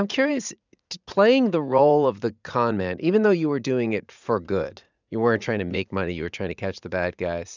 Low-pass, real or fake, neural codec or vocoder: 7.2 kHz; fake; vocoder, 44.1 kHz, 80 mel bands, Vocos